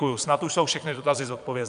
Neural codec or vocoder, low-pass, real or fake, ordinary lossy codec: vocoder, 22.05 kHz, 80 mel bands, Vocos; 9.9 kHz; fake; AAC, 96 kbps